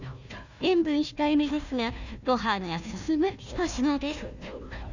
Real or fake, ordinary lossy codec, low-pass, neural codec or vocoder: fake; none; 7.2 kHz; codec, 16 kHz, 1 kbps, FunCodec, trained on Chinese and English, 50 frames a second